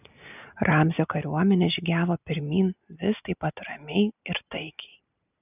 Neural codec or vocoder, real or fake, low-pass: none; real; 3.6 kHz